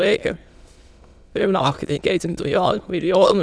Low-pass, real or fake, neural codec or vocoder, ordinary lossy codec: none; fake; autoencoder, 22.05 kHz, a latent of 192 numbers a frame, VITS, trained on many speakers; none